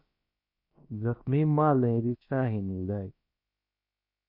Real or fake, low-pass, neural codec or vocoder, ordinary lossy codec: fake; 5.4 kHz; codec, 16 kHz, about 1 kbps, DyCAST, with the encoder's durations; MP3, 32 kbps